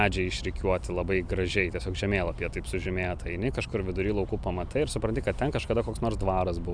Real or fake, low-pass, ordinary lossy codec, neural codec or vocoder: real; 9.9 kHz; MP3, 96 kbps; none